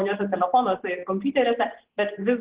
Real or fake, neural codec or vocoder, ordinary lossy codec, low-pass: real; none; Opus, 16 kbps; 3.6 kHz